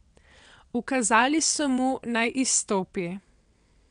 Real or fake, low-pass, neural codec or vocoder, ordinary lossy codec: fake; 9.9 kHz; vocoder, 22.05 kHz, 80 mel bands, WaveNeXt; none